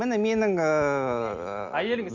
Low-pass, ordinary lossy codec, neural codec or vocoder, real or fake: 7.2 kHz; none; none; real